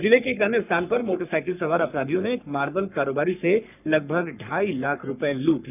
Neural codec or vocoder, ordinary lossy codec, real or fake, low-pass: codec, 44.1 kHz, 3.4 kbps, Pupu-Codec; none; fake; 3.6 kHz